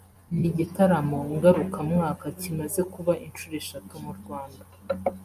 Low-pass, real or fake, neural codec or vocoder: 14.4 kHz; real; none